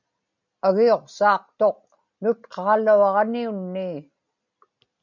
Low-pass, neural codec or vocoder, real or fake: 7.2 kHz; none; real